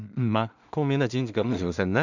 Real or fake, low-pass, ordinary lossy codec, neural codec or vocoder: fake; 7.2 kHz; none; codec, 16 kHz in and 24 kHz out, 0.4 kbps, LongCat-Audio-Codec, two codebook decoder